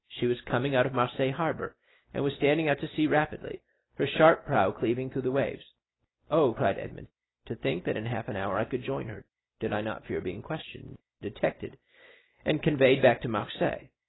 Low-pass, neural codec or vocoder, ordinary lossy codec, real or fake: 7.2 kHz; none; AAC, 16 kbps; real